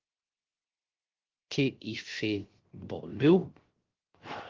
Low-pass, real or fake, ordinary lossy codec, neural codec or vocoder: 7.2 kHz; fake; Opus, 16 kbps; codec, 16 kHz, 0.3 kbps, FocalCodec